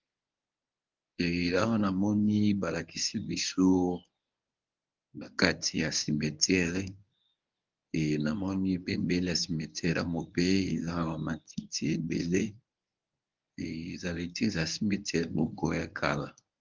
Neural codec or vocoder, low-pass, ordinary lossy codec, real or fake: codec, 24 kHz, 0.9 kbps, WavTokenizer, medium speech release version 1; 7.2 kHz; Opus, 32 kbps; fake